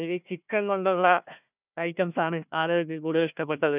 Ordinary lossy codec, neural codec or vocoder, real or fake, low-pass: none; codec, 16 kHz, 1 kbps, FunCodec, trained on Chinese and English, 50 frames a second; fake; 3.6 kHz